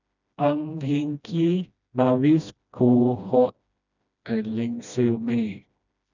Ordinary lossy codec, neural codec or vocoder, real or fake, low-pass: none; codec, 16 kHz, 1 kbps, FreqCodec, smaller model; fake; 7.2 kHz